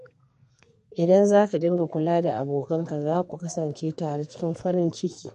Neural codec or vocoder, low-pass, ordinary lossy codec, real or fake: codec, 32 kHz, 1.9 kbps, SNAC; 14.4 kHz; MP3, 48 kbps; fake